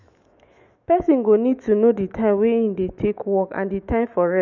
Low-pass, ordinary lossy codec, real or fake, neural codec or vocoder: 7.2 kHz; none; fake; autoencoder, 48 kHz, 128 numbers a frame, DAC-VAE, trained on Japanese speech